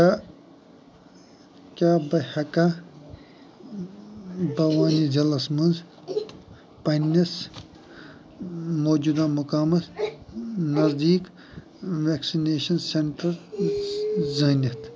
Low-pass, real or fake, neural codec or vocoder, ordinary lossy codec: none; real; none; none